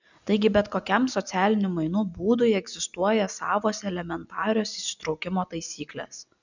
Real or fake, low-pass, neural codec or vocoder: real; 7.2 kHz; none